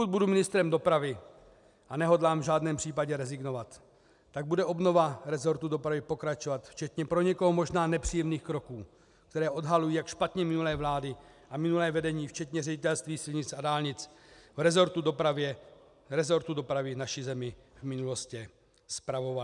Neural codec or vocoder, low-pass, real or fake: none; 10.8 kHz; real